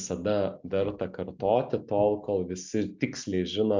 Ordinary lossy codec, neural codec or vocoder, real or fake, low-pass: MP3, 64 kbps; none; real; 7.2 kHz